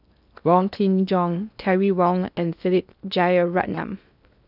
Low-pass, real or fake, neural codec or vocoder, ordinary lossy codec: 5.4 kHz; fake; codec, 16 kHz in and 24 kHz out, 0.6 kbps, FocalCodec, streaming, 2048 codes; none